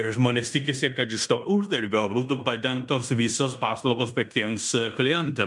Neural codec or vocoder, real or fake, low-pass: codec, 16 kHz in and 24 kHz out, 0.9 kbps, LongCat-Audio-Codec, fine tuned four codebook decoder; fake; 10.8 kHz